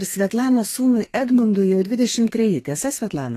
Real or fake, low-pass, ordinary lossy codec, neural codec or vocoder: fake; 14.4 kHz; AAC, 48 kbps; codec, 32 kHz, 1.9 kbps, SNAC